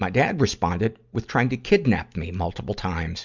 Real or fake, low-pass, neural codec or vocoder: real; 7.2 kHz; none